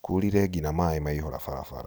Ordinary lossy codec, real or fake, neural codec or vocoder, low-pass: none; real; none; none